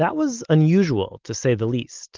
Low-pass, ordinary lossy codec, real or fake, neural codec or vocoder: 7.2 kHz; Opus, 32 kbps; real; none